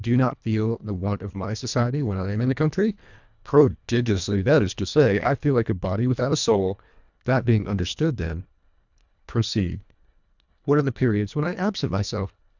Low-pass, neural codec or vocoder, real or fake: 7.2 kHz; codec, 24 kHz, 1.5 kbps, HILCodec; fake